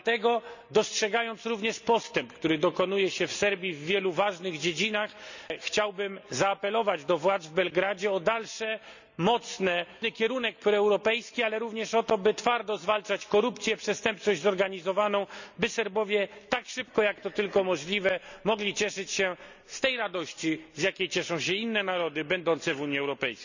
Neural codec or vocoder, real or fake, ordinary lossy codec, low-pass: none; real; none; 7.2 kHz